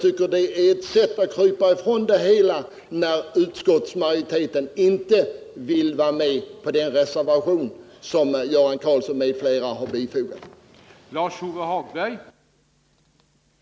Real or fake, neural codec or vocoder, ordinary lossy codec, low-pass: real; none; none; none